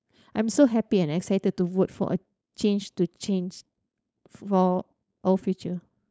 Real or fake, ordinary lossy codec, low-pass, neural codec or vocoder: fake; none; none; codec, 16 kHz, 4.8 kbps, FACodec